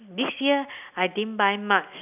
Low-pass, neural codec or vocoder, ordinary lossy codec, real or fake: 3.6 kHz; none; none; real